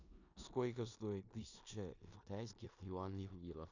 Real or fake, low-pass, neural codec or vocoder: fake; 7.2 kHz; codec, 16 kHz in and 24 kHz out, 0.9 kbps, LongCat-Audio-Codec, four codebook decoder